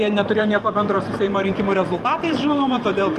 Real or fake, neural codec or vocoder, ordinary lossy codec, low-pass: fake; vocoder, 44.1 kHz, 128 mel bands every 512 samples, BigVGAN v2; Opus, 24 kbps; 14.4 kHz